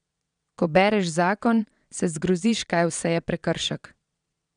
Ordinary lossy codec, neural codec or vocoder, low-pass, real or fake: none; vocoder, 22.05 kHz, 80 mel bands, Vocos; 9.9 kHz; fake